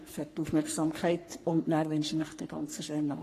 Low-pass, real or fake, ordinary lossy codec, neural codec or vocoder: 14.4 kHz; fake; AAC, 48 kbps; codec, 44.1 kHz, 3.4 kbps, Pupu-Codec